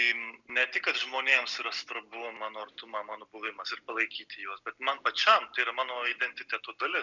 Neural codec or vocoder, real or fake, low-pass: none; real; 7.2 kHz